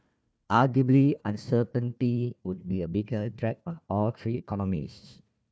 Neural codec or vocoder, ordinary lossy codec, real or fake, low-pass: codec, 16 kHz, 1 kbps, FunCodec, trained on Chinese and English, 50 frames a second; none; fake; none